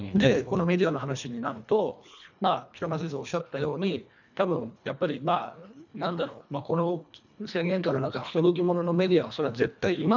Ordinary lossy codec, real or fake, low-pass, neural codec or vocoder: none; fake; 7.2 kHz; codec, 24 kHz, 1.5 kbps, HILCodec